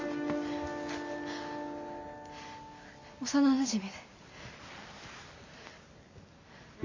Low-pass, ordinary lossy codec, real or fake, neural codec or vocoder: 7.2 kHz; AAC, 48 kbps; real; none